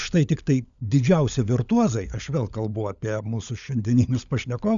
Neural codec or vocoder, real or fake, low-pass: codec, 16 kHz, 16 kbps, FunCodec, trained on LibriTTS, 50 frames a second; fake; 7.2 kHz